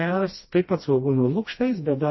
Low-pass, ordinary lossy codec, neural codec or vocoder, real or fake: 7.2 kHz; MP3, 24 kbps; codec, 16 kHz, 1 kbps, FreqCodec, smaller model; fake